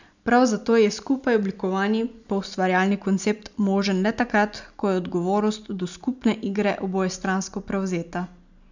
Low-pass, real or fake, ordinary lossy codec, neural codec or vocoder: 7.2 kHz; real; none; none